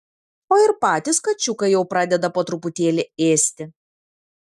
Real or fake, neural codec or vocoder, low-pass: real; none; 14.4 kHz